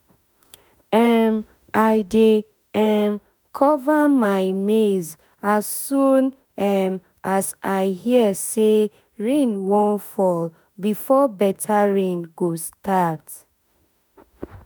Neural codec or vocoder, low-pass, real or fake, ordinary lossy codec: autoencoder, 48 kHz, 32 numbers a frame, DAC-VAE, trained on Japanese speech; none; fake; none